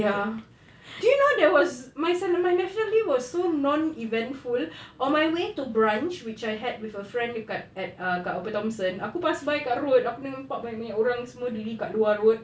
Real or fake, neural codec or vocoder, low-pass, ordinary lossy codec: real; none; none; none